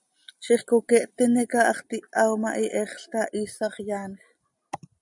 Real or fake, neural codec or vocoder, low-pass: real; none; 10.8 kHz